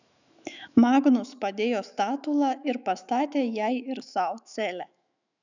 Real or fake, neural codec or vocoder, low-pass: fake; autoencoder, 48 kHz, 128 numbers a frame, DAC-VAE, trained on Japanese speech; 7.2 kHz